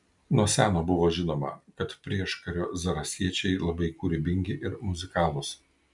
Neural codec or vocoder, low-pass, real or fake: none; 10.8 kHz; real